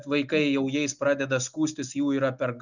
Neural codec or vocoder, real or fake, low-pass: none; real; 7.2 kHz